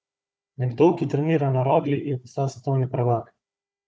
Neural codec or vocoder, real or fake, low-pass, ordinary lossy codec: codec, 16 kHz, 4 kbps, FunCodec, trained on Chinese and English, 50 frames a second; fake; none; none